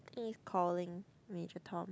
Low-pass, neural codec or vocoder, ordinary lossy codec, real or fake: none; none; none; real